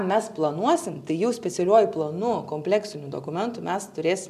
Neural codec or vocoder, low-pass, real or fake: none; 14.4 kHz; real